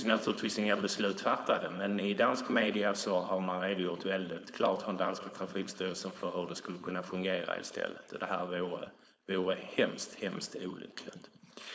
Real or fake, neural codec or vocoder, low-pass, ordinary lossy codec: fake; codec, 16 kHz, 4.8 kbps, FACodec; none; none